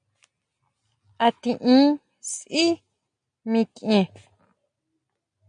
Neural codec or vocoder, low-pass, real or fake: none; 9.9 kHz; real